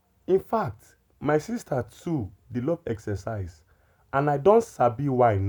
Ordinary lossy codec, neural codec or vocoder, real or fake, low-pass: none; none; real; none